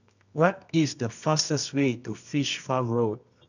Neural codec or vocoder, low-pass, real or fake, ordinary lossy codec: codec, 24 kHz, 0.9 kbps, WavTokenizer, medium music audio release; 7.2 kHz; fake; none